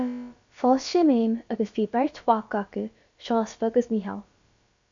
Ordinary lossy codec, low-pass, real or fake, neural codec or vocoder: AAC, 64 kbps; 7.2 kHz; fake; codec, 16 kHz, about 1 kbps, DyCAST, with the encoder's durations